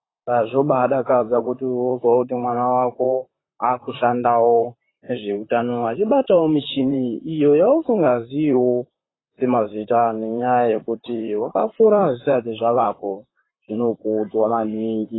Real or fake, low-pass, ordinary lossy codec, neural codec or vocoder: fake; 7.2 kHz; AAC, 16 kbps; vocoder, 44.1 kHz, 128 mel bands, Pupu-Vocoder